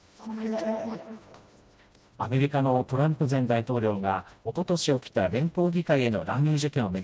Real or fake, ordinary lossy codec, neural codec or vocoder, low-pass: fake; none; codec, 16 kHz, 1 kbps, FreqCodec, smaller model; none